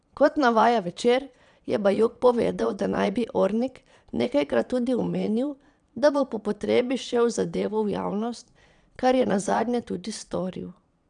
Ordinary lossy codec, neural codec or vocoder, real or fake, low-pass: none; vocoder, 22.05 kHz, 80 mel bands, Vocos; fake; 9.9 kHz